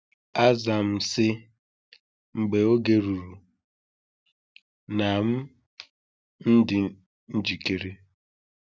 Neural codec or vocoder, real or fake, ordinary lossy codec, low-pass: none; real; none; none